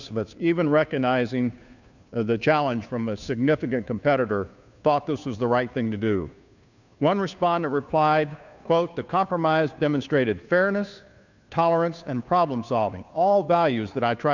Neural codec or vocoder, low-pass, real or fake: codec, 16 kHz, 2 kbps, FunCodec, trained on Chinese and English, 25 frames a second; 7.2 kHz; fake